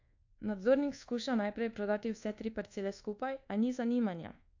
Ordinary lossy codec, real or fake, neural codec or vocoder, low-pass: AAC, 48 kbps; fake; codec, 24 kHz, 1.2 kbps, DualCodec; 7.2 kHz